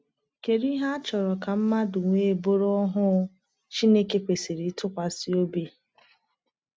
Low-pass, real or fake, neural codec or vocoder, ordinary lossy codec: none; real; none; none